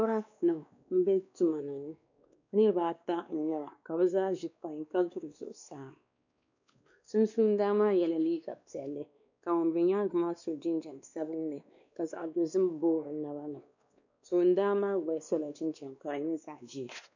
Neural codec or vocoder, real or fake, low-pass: codec, 16 kHz, 2 kbps, X-Codec, WavLM features, trained on Multilingual LibriSpeech; fake; 7.2 kHz